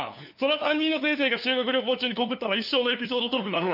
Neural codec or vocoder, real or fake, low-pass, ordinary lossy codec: codec, 16 kHz, 2 kbps, FunCodec, trained on LibriTTS, 25 frames a second; fake; 5.4 kHz; none